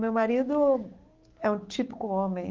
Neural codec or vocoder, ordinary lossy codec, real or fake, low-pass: codec, 16 kHz, 6 kbps, DAC; Opus, 16 kbps; fake; 7.2 kHz